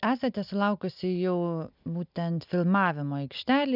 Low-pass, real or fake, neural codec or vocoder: 5.4 kHz; real; none